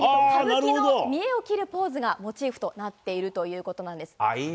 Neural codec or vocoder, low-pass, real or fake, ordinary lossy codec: none; none; real; none